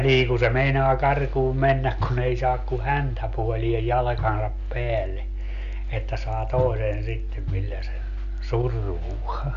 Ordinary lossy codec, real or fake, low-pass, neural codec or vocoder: none; real; 7.2 kHz; none